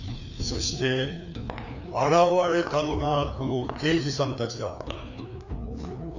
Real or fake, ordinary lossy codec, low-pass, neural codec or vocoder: fake; none; 7.2 kHz; codec, 16 kHz, 2 kbps, FreqCodec, larger model